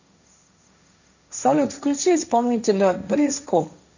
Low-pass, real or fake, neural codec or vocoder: 7.2 kHz; fake; codec, 16 kHz, 1.1 kbps, Voila-Tokenizer